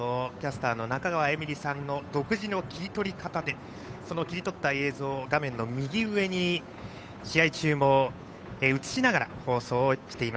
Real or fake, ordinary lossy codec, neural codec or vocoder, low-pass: fake; none; codec, 16 kHz, 8 kbps, FunCodec, trained on Chinese and English, 25 frames a second; none